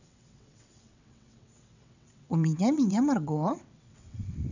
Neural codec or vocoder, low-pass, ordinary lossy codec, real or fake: vocoder, 22.05 kHz, 80 mel bands, WaveNeXt; 7.2 kHz; none; fake